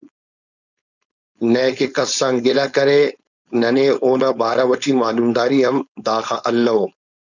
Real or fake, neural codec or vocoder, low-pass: fake; codec, 16 kHz, 4.8 kbps, FACodec; 7.2 kHz